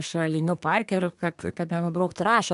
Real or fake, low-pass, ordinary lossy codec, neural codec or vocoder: fake; 10.8 kHz; AAC, 96 kbps; codec, 24 kHz, 1 kbps, SNAC